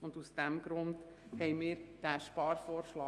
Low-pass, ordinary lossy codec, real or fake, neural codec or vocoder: 10.8 kHz; Opus, 32 kbps; fake; autoencoder, 48 kHz, 128 numbers a frame, DAC-VAE, trained on Japanese speech